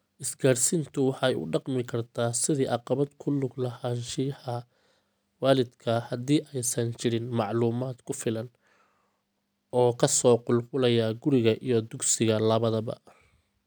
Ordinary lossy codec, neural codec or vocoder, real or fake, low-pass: none; none; real; none